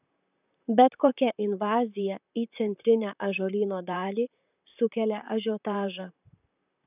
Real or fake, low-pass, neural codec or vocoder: fake; 3.6 kHz; vocoder, 44.1 kHz, 128 mel bands, Pupu-Vocoder